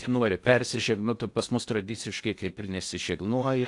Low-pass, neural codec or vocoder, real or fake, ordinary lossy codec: 10.8 kHz; codec, 16 kHz in and 24 kHz out, 0.8 kbps, FocalCodec, streaming, 65536 codes; fake; AAC, 64 kbps